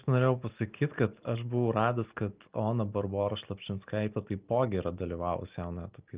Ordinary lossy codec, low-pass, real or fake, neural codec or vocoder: Opus, 32 kbps; 3.6 kHz; real; none